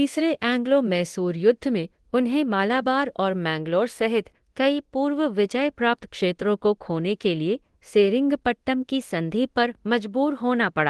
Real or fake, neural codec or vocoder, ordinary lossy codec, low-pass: fake; codec, 24 kHz, 0.9 kbps, DualCodec; Opus, 16 kbps; 10.8 kHz